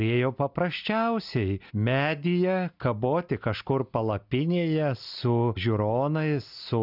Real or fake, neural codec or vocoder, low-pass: real; none; 5.4 kHz